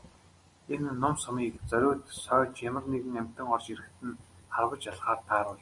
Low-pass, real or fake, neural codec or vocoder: 10.8 kHz; real; none